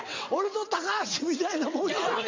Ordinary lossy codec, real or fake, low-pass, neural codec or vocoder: MP3, 64 kbps; fake; 7.2 kHz; vocoder, 22.05 kHz, 80 mel bands, WaveNeXt